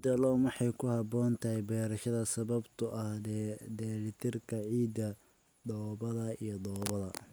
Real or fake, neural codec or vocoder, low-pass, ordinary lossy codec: real; none; none; none